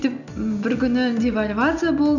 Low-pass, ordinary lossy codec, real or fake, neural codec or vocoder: 7.2 kHz; none; real; none